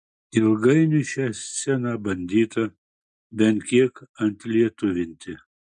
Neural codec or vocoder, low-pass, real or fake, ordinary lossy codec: none; 9.9 kHz; real; MP3, 64 kbps